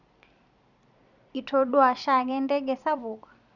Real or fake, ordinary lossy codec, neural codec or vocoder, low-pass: fake; none; vocoder, 44.1 kHz, 128 mel bands every 256 samples, BigVGAN v2; 7.2 kHz